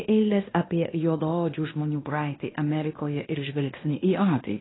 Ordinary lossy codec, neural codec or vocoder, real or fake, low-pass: AAC, 16 kbps; codec, 16 kHz in and 24 kHz out, 0.9 kbps, LongCat-Audio-Codec, fine tuned four codebook decoder; fake; 7.2 kHz